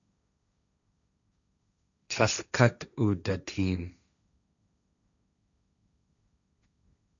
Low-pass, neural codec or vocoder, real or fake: 7.2 kHz; codec, 16 kHz, 1.1 kbps, Voila-Tokenizer; fake